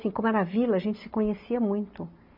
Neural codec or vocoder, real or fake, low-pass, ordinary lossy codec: none; real; 5.4 kHz; none